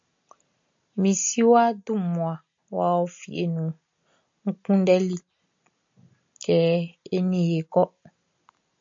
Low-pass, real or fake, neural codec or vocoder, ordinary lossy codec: 7.2 kHz; real; none; MP3, 48 kbps